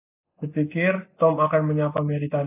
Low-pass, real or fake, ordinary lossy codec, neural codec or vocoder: 3.6 kHz; real; AAC, 24 kbps; none